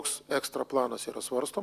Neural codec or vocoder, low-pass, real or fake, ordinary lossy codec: none; 14.4 kHz; real; Opus, 64 kbps